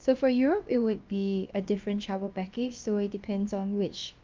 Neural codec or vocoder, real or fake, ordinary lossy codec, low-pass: codec, 16 kHz, about 1 kbps, DyCAST, with the encoder's durations; fake; Opus, 24 kbps; 7.2 kHz